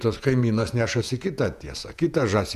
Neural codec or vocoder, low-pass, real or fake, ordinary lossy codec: vocoder, 48 kHz, 128 mel bands, Vocos; 14.4 kHz; fake; AAC, 96 kbps